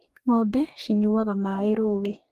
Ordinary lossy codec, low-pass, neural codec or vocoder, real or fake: Opus, 24 kbps; 14.4 kHz; codec, 44.1 kHz, 2.6 kbps, DAC; fake